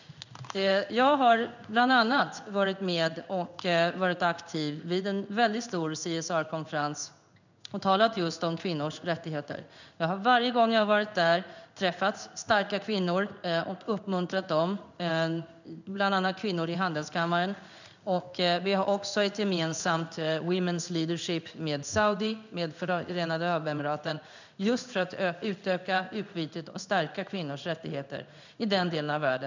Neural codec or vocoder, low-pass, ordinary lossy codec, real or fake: codec, 16 kHz in and 24 kHz out, 1 kbps, XY-Tokenizer; 7.2 kHz; none; fake